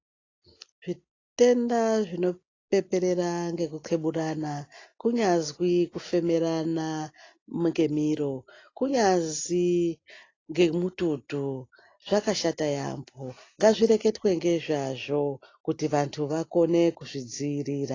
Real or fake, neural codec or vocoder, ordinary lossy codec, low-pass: real; none; AAC, 32 kbps; 7.2 kHz